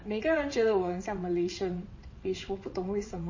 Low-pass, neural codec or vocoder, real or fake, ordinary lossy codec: 7.2 kHz; vocoder, 22.05 kHz, 80 mel bands, WaveNeXt; fake; MP3, 32 kbps